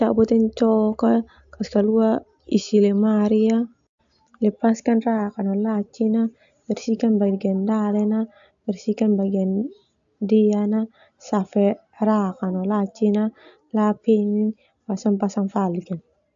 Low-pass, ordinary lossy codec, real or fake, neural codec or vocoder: 7.2 kHz; AAC, 64 kbps; real; none